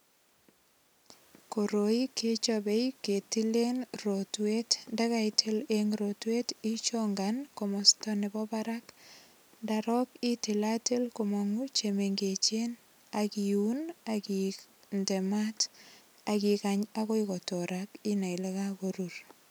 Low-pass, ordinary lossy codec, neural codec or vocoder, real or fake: none; none; none; real